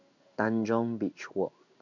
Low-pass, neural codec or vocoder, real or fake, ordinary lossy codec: 7.2 kHz; codec, 16 kHz in and 24 kHz out, 1 kbps, XY-Tokenizer; fake; none